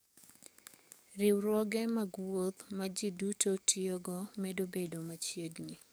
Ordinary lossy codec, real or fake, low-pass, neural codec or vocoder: none; fake; none; codec, 44.1 kHz, 7.8 kbps, DAC